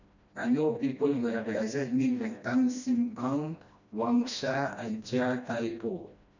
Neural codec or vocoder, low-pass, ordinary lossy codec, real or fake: codec, 16 kHz, 1 kbps, FreqCodec, smaller model; 7.2 kHz; none; fake